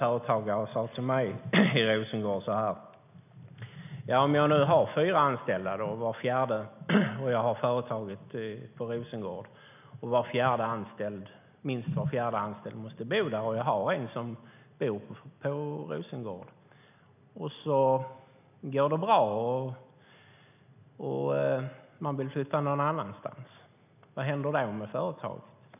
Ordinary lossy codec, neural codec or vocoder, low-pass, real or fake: none; none; 3.6 kHz; real